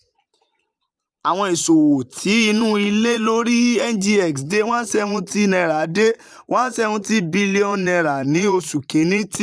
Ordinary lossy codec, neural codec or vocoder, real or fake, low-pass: none; vocoder, 22.05 kHz, 80 mel bands, Vocos; fake; none